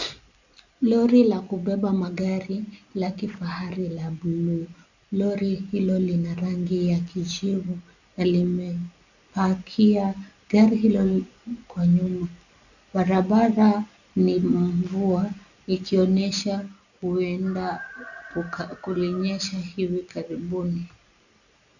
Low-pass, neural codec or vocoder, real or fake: 7.2 kHz; none; real